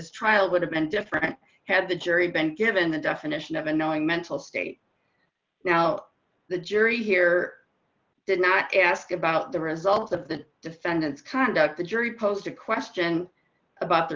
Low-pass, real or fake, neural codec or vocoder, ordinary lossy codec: 7.2 kHz; real; none; Opus, 24 kbps